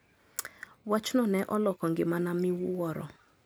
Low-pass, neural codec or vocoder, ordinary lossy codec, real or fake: none; none; none; real